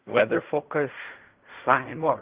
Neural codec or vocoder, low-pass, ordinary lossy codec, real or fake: codec, 16 kHz in and 24 kHz out, 0.4 kbps, LongCat-Audio-Codec, fine tuned four codebook decoder; 3.6 kHz; Opus, 32 kbps; fake